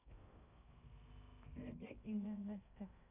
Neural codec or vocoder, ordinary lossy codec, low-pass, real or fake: codec, 16 kHz in and 24 kHz out, 0.6 kbps, FocalCodec, streaming, 2048 codes; none; 3.6 kHz; fake